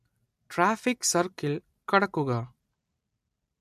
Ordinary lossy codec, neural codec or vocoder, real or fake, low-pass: MP3, 64 kbps; none; real; 14.4 kHz